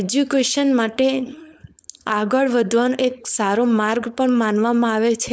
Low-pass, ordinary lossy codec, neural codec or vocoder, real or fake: none; none; codec, 16 kHz, 4.8 kbps, FACodec; fake